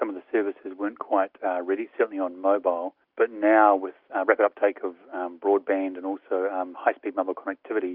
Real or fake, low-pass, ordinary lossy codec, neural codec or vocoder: real; 5.4 kHz; Opus, 64 kbps; none